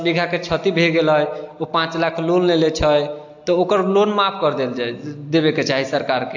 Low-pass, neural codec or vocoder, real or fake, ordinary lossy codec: 7.2 kHz; none; real; AAC, 48 kbps